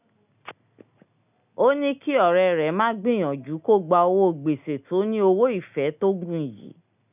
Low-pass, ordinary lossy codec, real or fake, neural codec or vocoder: 3.6 kHz; none; real; none